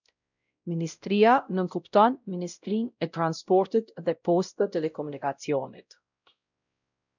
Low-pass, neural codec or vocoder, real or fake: 7.2 kHz; codec, 16 kHz, 0.5 kbps, X-Codec, WavLM features, trained on Multilingual LibriSpeech; fake